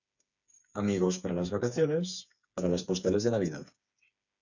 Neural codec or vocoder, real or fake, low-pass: codec, 16 kHz, 4 kbps, FreqCodec, smaller model; fake; 7.2 kHz